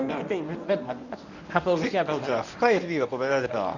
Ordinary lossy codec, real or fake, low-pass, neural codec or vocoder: none; fake; 7.2 kHz; codec, 24 kHz, 0.9 kbps, WavTokenizer, medium speech release version 1